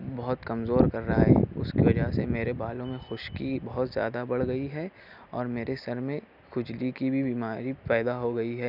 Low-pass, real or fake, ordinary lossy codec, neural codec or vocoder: 5.4 kHz; real; none; none